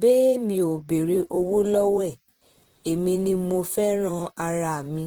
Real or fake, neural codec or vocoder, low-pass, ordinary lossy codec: fake; vocoder, 44.1 kHz, 128 mel bands, Pupu-Vocoder; 19.8 kHz; Opus, 16 kbps